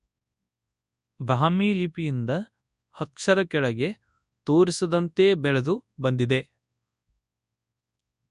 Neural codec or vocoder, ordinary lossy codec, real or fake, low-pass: codec, 24 kHz, 0.9 kbps, WavTokenizer, large speech release; none; fake; 10.8 kHz